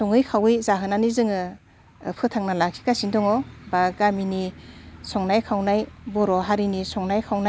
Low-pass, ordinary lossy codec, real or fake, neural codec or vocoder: none; none; real; none